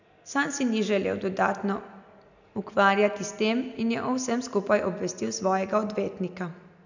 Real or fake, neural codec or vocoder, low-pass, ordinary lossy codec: real; none; 7.2 kHz; none